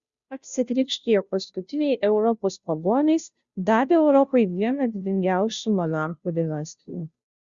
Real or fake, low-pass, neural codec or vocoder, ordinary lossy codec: fake; 7.2 kHz; codec, 16 kHz, 0.5 kbps, FunCodec, trained on Chinese and English, 25 frames a second; Opus, 64 kbps